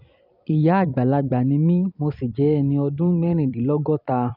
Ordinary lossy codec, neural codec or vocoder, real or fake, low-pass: none; vocoder, 44.1 kHz, 128 mel bands every 256 samples, BigVGAN v2; fake; 5.4 kHz